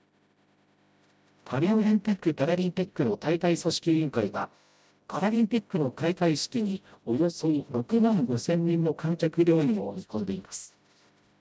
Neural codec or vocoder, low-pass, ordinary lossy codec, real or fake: codec, 16 kHz, 0.5 kbps, FreqCodec, smaller model; none; none; fake